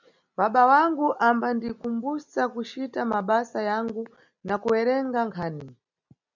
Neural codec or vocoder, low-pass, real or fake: none; 7.2 kHz; real